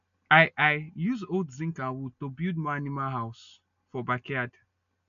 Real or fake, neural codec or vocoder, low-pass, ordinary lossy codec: real; none; 7.2 kHz; none